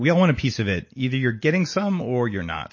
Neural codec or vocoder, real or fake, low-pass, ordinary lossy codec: none; real; 7.2 kHz; MP3, 32 kbps